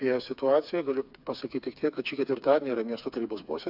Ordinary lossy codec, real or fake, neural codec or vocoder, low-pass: AAC, 48 kbps; fake; codec, 16 kHz, 4 kbps, FreqCodec, smaller model; 5.4 kHz